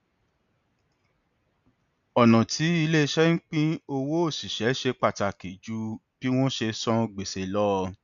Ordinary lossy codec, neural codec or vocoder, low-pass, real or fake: none; none; 7.2 kHz; real